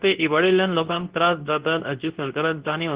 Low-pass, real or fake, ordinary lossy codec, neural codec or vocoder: 3.6 kHz; fake; Opus, 16 kbps; codec, 24 kHz, 0.9 kbps, WavTokenizer, medium speech release version 2